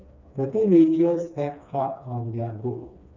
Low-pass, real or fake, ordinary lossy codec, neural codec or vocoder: 7.2 kHz; fake; none; codec, 16 kHz, 2 kbps, FreqCodec, smaller model